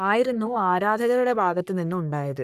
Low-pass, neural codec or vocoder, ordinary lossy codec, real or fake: 14.4 kHz; codec, 44.1 kHz, 3.4 kbps, Pupu-Codec; none; fake